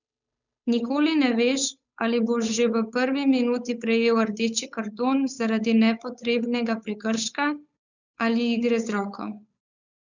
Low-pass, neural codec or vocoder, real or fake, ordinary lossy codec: 7.2 kHz; codec, 16 kHz, 8 kbps, FunCodec, trained on Chinese and English, 25 frames a second; fake; none